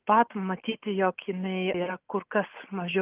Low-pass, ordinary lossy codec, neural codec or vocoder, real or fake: 3.6 kHz; Opus, 24 kbps; none; real